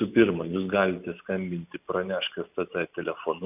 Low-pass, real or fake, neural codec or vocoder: 3.6 kHz; real; none